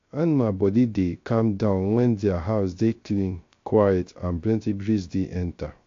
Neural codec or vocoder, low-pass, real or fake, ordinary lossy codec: codec, 16 kHz, 0.3 kbps, FocalCodec; 7.2 kHz; fake; AAC, 48 kbps